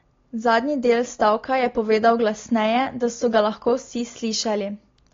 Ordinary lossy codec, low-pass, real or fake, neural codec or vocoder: AAC, 32 kbps; 7.2 kHz; real; none